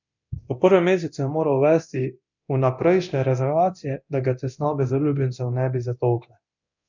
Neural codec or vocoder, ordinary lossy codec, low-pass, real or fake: codec, 24 kHz, 0.9 kbps, DualCodec; none; 7.2 kHz; fake